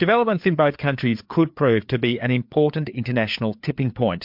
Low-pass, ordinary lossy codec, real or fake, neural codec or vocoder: 5.4 kHz; MP3, 48 kbps; fake; codec, 16 kHz, 4 kbps, FreqCodec, larger model